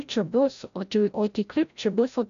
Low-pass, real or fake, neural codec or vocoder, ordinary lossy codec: 7.2 kHz; fake; codec, 16 kHz, 0.5 kbps, FreqCodec, larger model; MP3, 96 kbps